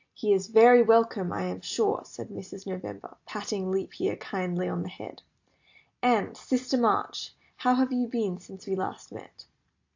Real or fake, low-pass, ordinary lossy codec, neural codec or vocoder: real; 7.2 kHz; AAC, 48 kbps; none